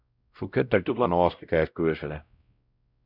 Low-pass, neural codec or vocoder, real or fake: 5.4 kHz; codec, 16 kHz, 0.5 kbps, X-Codec, WavLM features, trained on Multilingual LibriSpeech; fake